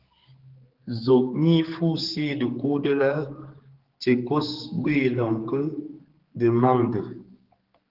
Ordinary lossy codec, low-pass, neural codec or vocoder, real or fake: Opus, 16 kbps; 5.4 kHz; codec, 16 kHz, 4 kbps, X-Codec, HuBERT features, trained on general audio; fake